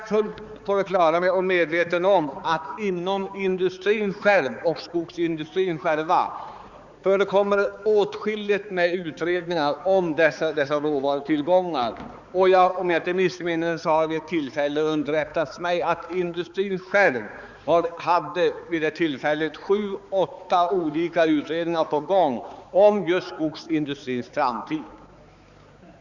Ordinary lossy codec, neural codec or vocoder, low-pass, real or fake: Opus, 64 kbps; codec, 16 kHz, 4 kbps, X-Codec, HuBERT features, trained on balanced general audio; 7.2 kHz; fake